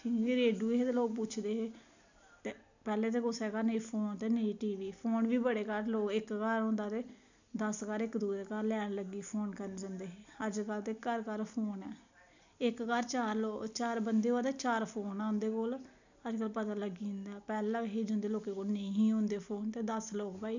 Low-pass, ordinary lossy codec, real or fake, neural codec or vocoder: 7.2 kHz; none; real; none